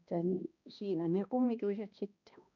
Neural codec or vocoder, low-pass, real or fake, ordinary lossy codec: codec, 16 kHz, 1 kbps, X-Codec, HuBERT features, trained on balanced general audio; 7.2 kHz; fake; none